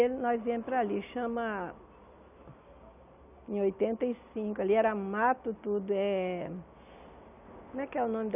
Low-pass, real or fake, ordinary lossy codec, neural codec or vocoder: 3.6 kHz; real; none; none